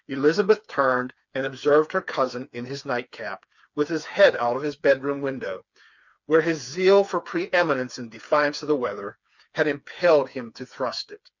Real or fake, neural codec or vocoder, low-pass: fake; codec, 16 kHz, 4 kbps, FreqCodec, smaller model; 7.2 kHz